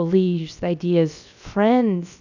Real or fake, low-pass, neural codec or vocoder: fake; 7.2 kHz; codec, 16 kHz, about 1 kbps, DyCAST, with the encoder's durations